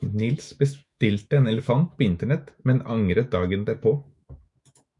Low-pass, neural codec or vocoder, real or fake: 10.8 kHz; autoencoder, 48 kHz, 128 numbers a frame, DAC-VAE, trained on Japanese speech; fake